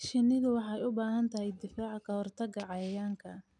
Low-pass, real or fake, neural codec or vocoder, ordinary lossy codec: 10.8 kHz; real; none; none